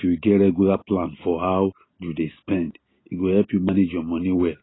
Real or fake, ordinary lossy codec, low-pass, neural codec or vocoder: real; AAC, 16 kbps; 7.2 kHz; none